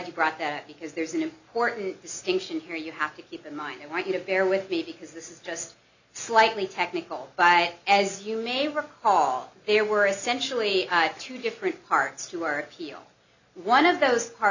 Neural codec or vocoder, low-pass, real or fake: none; 7.2 kHz; real